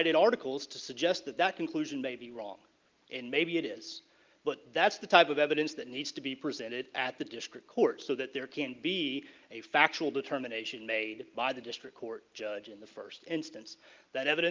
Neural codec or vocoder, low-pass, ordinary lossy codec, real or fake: none; 7.2 kHz; Opus, 32 kbps; real